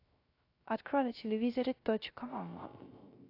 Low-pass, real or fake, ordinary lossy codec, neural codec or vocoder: 5.4 kHz; fake; AAC, 32 kbps; codec, 16 kHz, 0.3 kbps, FocalCodec